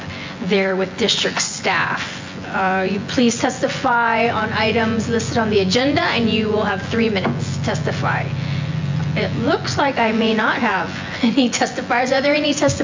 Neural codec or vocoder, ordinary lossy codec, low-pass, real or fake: vocoder, 24 kHz, 100 mel bands, Vocos; MP3, 48 kbps; 7.2 kHz; fake